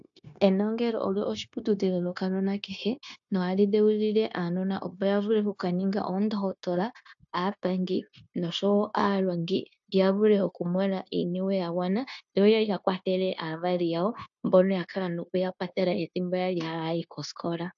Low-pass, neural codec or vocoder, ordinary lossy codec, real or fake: 7.2 kHz; codec, 16 kHz, 0.9 kbps, LongCat-Audio-Codec; AAC, 64 kbps; fake